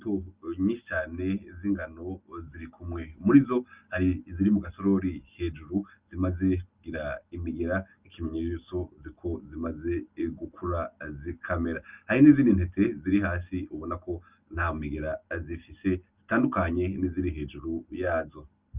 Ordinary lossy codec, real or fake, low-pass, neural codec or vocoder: Opus, 24 kbps; real; 3.6 kHz; none